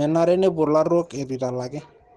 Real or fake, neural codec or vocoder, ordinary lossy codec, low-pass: real; none; Opus, 16 kbps; 10.8 kHz